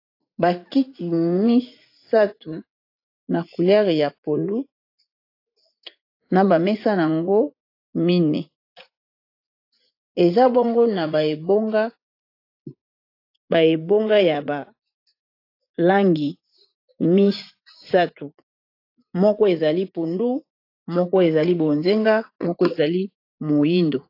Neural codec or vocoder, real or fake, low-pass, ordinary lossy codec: vocoder, 44.1 kHz, 80 mel bands, Vocos; fake; 5.4 kHz; AAC, 32 kbps